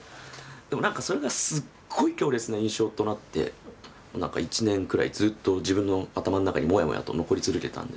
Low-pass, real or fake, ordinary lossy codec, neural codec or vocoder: none; real; none; none